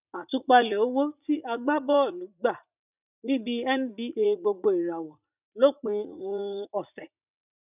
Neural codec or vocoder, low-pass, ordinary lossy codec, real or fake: vocoder, 44.1 kHz, 80 mel bands, Vocos; 3.6 kHz; none; fake